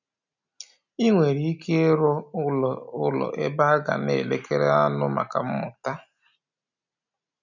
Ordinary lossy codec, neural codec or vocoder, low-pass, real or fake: none; none; 7.2 kHz; real